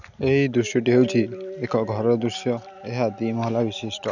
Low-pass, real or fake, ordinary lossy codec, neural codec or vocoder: 7.2 kHz; real; none; none